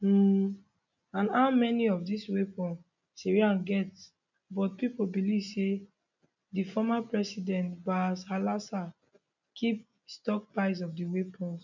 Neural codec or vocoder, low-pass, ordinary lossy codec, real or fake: none; 7.2 kHz; none; real